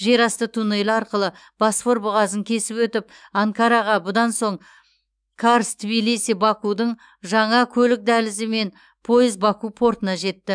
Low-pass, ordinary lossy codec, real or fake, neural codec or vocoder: 9.9 kHz; none; fake; autoencoder, 48 kHz, 128 numbers a frame, DAC-VAE, trained on Japanese speech